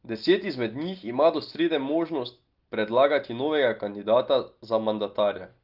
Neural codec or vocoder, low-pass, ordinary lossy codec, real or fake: none; 5.4 kHz; Opus, 32 kbps; real